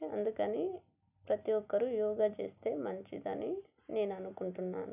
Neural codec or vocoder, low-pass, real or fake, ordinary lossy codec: none; 3.6 kHz; real; none